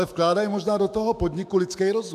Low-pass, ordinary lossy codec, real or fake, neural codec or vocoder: 14.4 kHz; AAC, 96 kbps; fake; autoencoder, 48 kHz, 128 numbers a frame, DAC-VAE, trained on Japanese speech